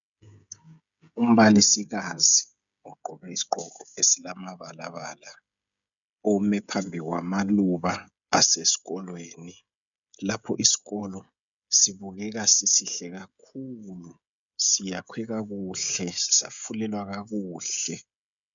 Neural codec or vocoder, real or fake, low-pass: codec, 16 kHz, 16 kbps, FreqCodec, smaller model; fake; 7.2 kHz